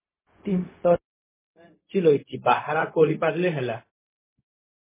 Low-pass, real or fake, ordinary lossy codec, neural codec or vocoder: 3.6 kHz; fake; MP3, 16 kbps; codec, 16 kHz, 0.4 kbps, LongCat-Audio-Codec